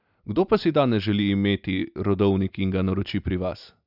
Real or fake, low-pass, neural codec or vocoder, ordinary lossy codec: real; 5.4 kHz; none; none